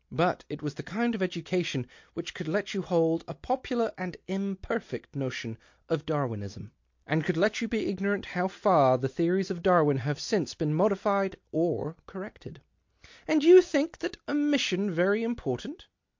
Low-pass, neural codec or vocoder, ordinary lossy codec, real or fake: 7.2 kHz; none; MP3, 48 kbps; real